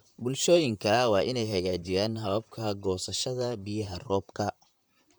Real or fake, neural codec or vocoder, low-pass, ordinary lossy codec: fake; vocoder, 44.1 kHz, 128 mel bands, Pupu-Vocoder; none; none